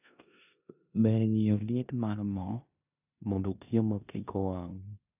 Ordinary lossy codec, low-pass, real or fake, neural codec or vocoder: none; 3.6 kHz; fake; codec, 16 kHz in and 24 kHz out, 0.9 kbps, LongCat-Audio-Codec, four codebook decoder